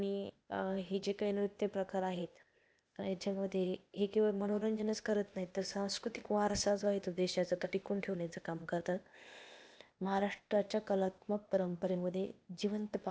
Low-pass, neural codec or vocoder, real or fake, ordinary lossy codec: none; codec, 16 kHz, 0.8 kbps, ZipCodec; fake; none